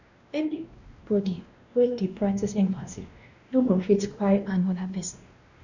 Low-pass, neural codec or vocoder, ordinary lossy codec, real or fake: 7.2 kHz; codec, 16 kHz, 1 kbps, X-Codec, WavLM features, trained on Multilingual LibriSpeech; none; fake